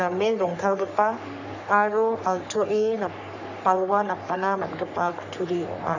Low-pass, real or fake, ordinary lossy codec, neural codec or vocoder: 7.2 kHz; fake; none; codec, 44.1 kHz, 3.4 kbps, Pupu-Codec